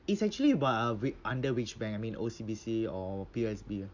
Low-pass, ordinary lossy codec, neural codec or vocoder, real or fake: 7.2 kHz; none; none; real